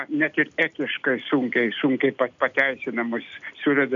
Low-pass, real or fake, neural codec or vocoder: 7.2 kHz; real; none